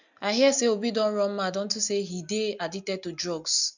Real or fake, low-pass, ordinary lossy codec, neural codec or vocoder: real; 7.2 kHz; none; none